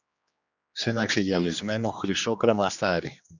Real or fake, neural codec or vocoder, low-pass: fake; codec, 16 kHz, 2 kbps, X-Codec, HuBERT features, trained on general audio; 7.2 kHz